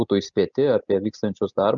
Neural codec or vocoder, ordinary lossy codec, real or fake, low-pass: none; Opus, 64 kbps; real; 7.2 kHz